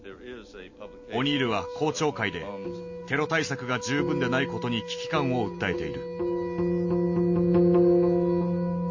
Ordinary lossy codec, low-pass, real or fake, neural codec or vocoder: MP3, 32 kbps; 7.2 kHz; real; none